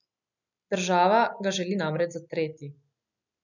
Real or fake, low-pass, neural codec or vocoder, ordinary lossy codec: real; 7.2 kHz; none; none